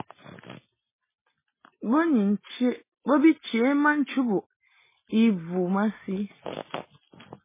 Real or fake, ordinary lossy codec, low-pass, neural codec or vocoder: real; MP3, 16 kbps; 3.6 kHz; none